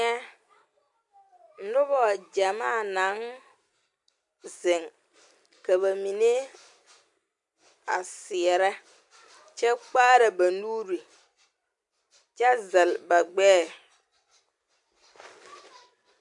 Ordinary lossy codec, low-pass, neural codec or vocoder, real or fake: MP3, 64 kbps; 10.8 kHz; none; real